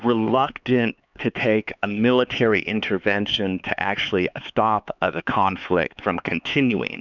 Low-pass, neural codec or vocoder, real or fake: 7.2 kHz; codec, 16 kHz, 4 kbps, X-Codec, HuBERT features, trained on LibriSpeech; fake